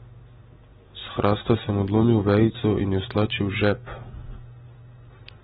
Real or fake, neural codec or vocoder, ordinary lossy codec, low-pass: real; none; AAC, 16 kbps; 7.2 kHz